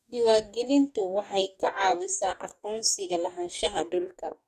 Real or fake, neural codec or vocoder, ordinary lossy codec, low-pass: fake; codec, 44.1 kHz, 2.6 kbps, DAC; none; 14.4 kHz